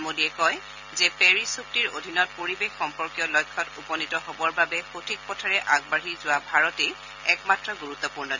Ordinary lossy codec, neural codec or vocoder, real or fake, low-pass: none; none; real; 7.2 kHz